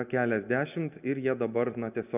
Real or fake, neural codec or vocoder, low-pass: real; none; 3.6 kHz